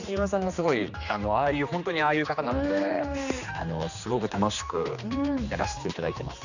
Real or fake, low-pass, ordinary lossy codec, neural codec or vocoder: fake; 7.2 kHz; none; codec, 16 kHz, 2 kbps, X-Codec, HuBERT features, trained on general audio